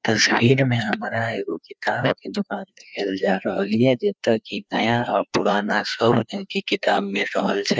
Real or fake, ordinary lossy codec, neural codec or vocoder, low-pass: fake; none; codec, 16 kHz, 2 kbps, FreqCodec, larger model; none